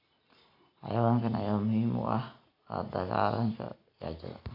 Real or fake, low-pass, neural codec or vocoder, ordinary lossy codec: real; 5.4 kHz; none; AAC, 48 kbps